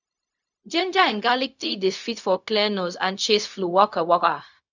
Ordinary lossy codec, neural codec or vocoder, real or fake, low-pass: none; codec, 16 kHz, 0.4 kbps, LongCat-Audio-Codec; fake; 7.2 kHz